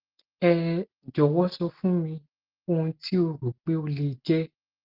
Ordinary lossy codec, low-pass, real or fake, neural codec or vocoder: Opus, 16 kbps; 5.4 kHz; real; none